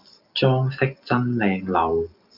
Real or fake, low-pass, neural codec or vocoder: real; 5.4 kHz; none